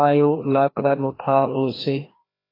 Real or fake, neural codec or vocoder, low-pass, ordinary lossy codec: fake; codec, 16 kHz, 1 kbps, FreqCodec, larger model; 5.4 kHz; AAC, 24 kbps